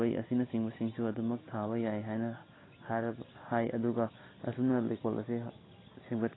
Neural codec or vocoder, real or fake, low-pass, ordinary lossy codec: none; real; 7.2 kHz; AAC, 16 kbps